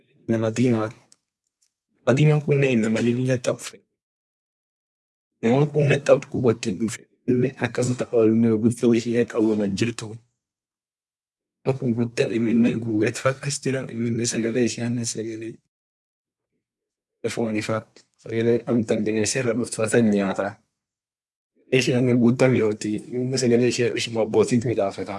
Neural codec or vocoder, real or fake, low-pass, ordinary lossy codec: codec, 24 kHz, 1 kbps, SNAC; fake; none; none